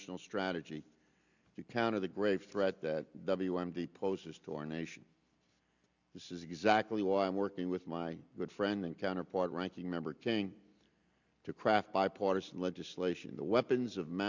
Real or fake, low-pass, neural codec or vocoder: real; 7.2 kHz; none